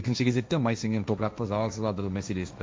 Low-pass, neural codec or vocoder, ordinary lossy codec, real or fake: none; codec, 16 kHz, 1.1 kbps, Voila-Tokenizer; none; fake